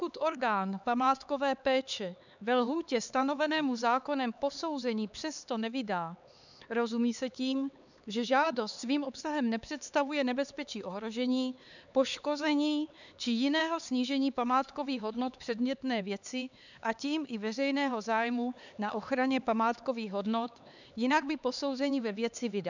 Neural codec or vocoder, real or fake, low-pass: codec, 16 kHz, 4 kbps, X-Codec, HuBERT features, trained on LibriSpeech; fake; 7.2 kHz